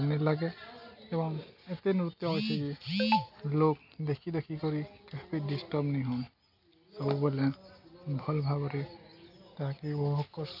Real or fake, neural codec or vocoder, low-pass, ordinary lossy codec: real; none; 5.4 kHz; none